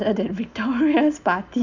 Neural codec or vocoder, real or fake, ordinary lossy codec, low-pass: none; real; none; 7.2 kHz